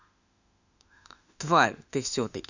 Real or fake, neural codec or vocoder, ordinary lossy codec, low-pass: fake; autoencoder, 48 kHz, 32 numbers a frame, DAC-VAE, trained on Japanese speech; none; 7.2 kHz